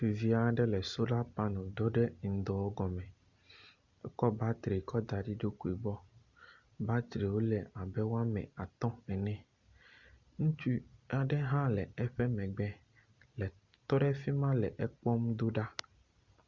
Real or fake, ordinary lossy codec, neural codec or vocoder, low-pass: real; Opus, 64 kbps; none; 7.2 kHz